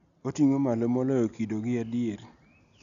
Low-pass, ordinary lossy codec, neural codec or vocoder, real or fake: 7.2 kHz; none; none; real